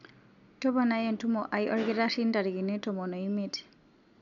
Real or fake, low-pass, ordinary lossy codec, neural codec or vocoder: real; 7.2 kHz; none; none